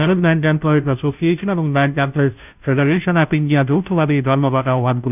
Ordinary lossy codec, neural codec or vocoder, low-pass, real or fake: none; codec, 16 kHz, 0.5 kbps, FunCodec, trained on Chinese and English, 25 frames a second; 3.6 kHz; fake